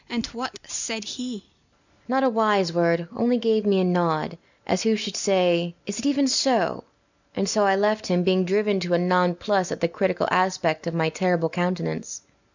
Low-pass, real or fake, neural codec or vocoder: 7.2 kHz; real; none